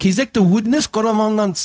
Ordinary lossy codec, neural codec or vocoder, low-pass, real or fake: none; codec, 16 kHz, 0.4 kbps, LongCat-Audio-Codec; none; fake